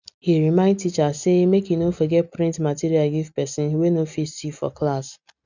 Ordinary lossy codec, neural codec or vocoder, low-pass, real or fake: none; none; 7.2 kHz; real